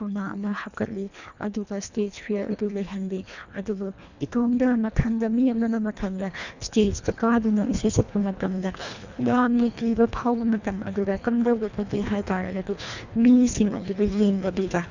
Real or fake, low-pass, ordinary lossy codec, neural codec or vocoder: fake; 7.2 kHz; none; codec, 24 kHz, 1.5 kbps, HILCodec